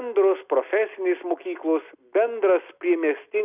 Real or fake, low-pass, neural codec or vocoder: real; 3.6 kHz; none